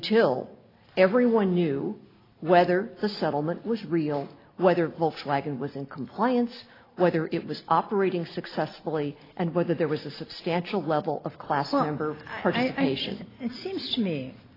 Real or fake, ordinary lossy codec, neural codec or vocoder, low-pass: real; AAC, 24 kbps; none; 5.4 kHz